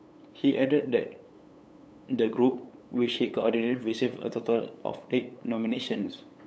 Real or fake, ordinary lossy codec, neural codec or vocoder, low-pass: fake; none; codec, 16 kHz, 8 kbps, FunCodec, trained on LibriTTS, 25 frames a second; none